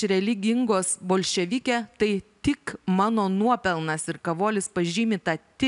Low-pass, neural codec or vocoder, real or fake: 10.8 kHz; none; real